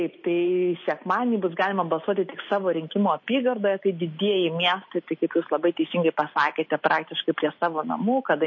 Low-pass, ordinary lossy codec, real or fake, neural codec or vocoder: 7.2 kHz; MP3, 32 kbps; real; none